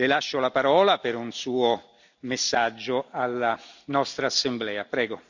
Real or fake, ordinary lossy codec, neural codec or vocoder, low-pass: real; none; none; 7.2 kHz